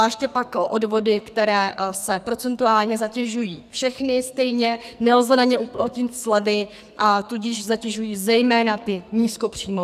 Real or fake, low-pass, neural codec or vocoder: fake; 14.4 kHz; codec, 44.1 kHz, 2.6 kbps, SNAC